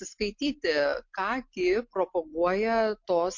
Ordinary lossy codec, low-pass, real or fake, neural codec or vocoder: MP3, 48 kbps; 7.2 kHz; real; none